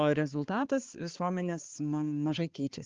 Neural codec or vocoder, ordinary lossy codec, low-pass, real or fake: codec, 16 kHz, 4 kbps, X-Codec, HuBERT features, trained on balanced general audio; Opus, 16 kbps; 7.2 kHz; fake